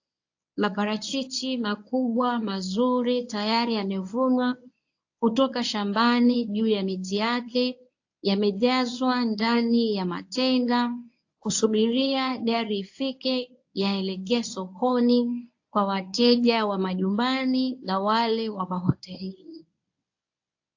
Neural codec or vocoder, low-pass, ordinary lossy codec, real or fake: codec, 24 kHz, 0.9 kbps, WavTokenizer, medium speech release version 1; 7.2 kHz; AAC, 48 kbps; fake